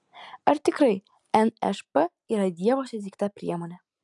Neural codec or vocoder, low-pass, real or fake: none; 10.8 kHz; real